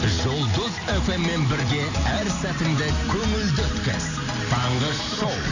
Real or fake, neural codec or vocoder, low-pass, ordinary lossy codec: real; none; 7.2 kHz; none